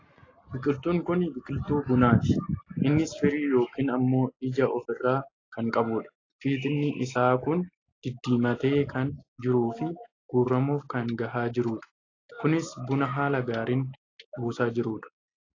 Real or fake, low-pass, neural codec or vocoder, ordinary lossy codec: real; 7.2 kHz; none; AAC, 32 kbps